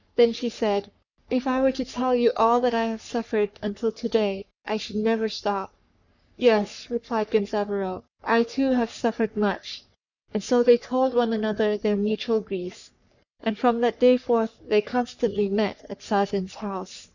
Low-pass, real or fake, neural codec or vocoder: 7.2 kHz; fake; codec, 44.1 kHz, 3.4 kbps, Pupu-Codec